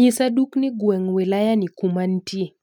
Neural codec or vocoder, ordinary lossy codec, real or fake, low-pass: none; none; real; 19.8 kHz